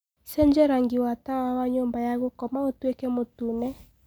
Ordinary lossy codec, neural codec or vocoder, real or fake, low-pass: none; none; real; none